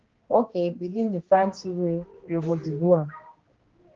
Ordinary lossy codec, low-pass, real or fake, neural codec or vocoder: Opus, 16 kbps; 7.2 kHz; fake; codec, 16 kHz, 1 kbps, X-Codec, HuBERT features, trained on general audio